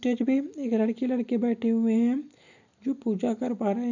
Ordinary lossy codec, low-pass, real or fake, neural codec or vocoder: none; 7.2 kHz; real; none